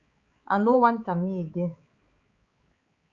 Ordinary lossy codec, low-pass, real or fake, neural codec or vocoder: Opus, 64 kbps; 7.2 kHz; fake; codec, 16 kHz, 4 kbps, X-Codec, HuBERT features, trained on balanced general audio